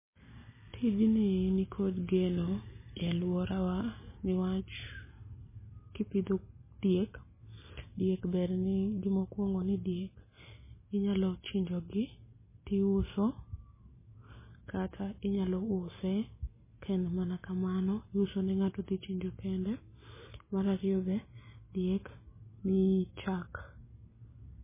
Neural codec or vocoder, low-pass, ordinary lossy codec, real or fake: none; 3.6 kHz; MP3, 16 kbps; real